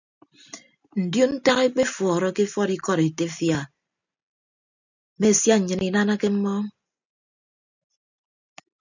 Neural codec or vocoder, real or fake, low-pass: none; real; 7.2 kHz